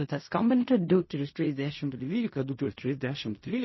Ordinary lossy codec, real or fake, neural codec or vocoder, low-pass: MP3, 24 kbps; fake; codec, 16 kHz in and 24 kHz out, 0.4 kbps, LongCat-Audio-Codec, fine tuned four codebook decoder; 7.2 kHz